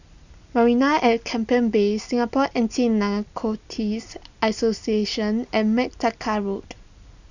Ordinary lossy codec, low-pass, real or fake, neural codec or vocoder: none; 7.2 kHz; real; none